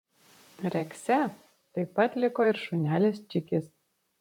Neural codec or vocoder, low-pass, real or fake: vocoder, 44.1 kHz, 128 mel bands, Pupu-Vocoder; 19.8 kHz; fake